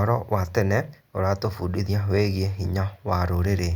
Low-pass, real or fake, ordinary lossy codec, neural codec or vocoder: 19.8 kHz; real; Opus, 64 kbps; none